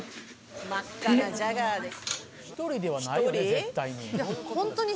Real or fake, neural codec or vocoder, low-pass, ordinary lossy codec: real; none; none; none